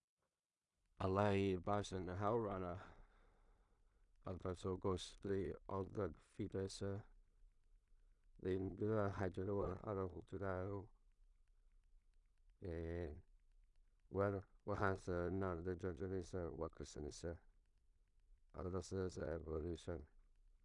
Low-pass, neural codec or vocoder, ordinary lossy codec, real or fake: 10.8 kHz; codec, 16 kHz in and 24 kHz out, 0.4 kbps, LongCat-Audio-Codec, two codebook decoder; none; fake